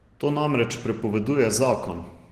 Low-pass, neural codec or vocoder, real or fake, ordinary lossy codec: 14.4 kHz; none; real; Opus, 16 kbps